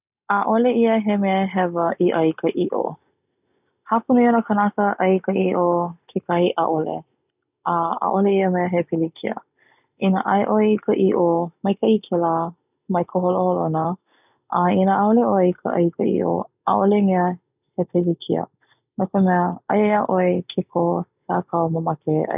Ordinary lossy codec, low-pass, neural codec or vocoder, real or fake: none; 3.6 kHz; none; real